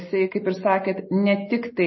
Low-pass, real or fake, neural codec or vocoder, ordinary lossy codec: 7.2 kHz; real; none; MP3, 24 kbps